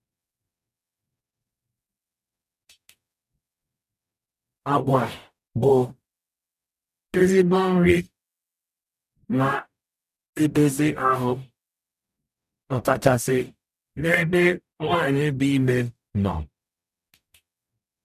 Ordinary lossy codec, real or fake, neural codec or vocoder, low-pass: none; fake; codec, 44.1 kHz, 0.9 kbps, DAC; 14.4 kHz